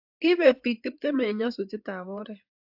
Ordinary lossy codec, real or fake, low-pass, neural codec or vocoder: AAC, 48 kbps; fake; 5.4 kHz; codec, 16 kHz in and 24 kHz out, 2.2 kbps, FireRedTTS-2 codec